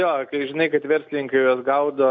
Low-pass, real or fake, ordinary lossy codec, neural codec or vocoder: 7.2 kHz; real; MP3, 64 kbps; none